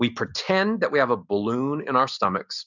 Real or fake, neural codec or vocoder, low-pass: real; none; 7.2 kHz